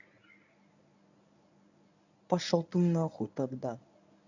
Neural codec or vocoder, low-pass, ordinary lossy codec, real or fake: codec, 24 kHz, 0.9 kbps, WavTokenizer, medium speech release version 1; 7.2 kHz; none; fake